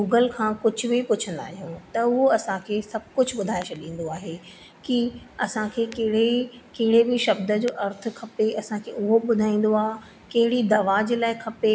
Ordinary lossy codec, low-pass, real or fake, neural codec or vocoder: none; none; real; none